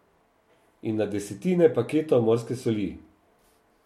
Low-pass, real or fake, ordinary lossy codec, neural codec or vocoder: 19.8 kHz; real; MP3, 64 kbps; none